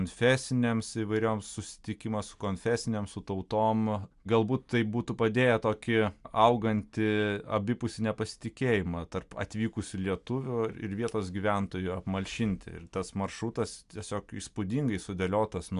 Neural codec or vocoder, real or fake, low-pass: none; real; 10.8 kHz